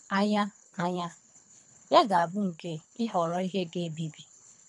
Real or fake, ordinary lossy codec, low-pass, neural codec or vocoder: fake; none; 10.8 kHz; codec, 24 kHz, 3 kbps, HILCodec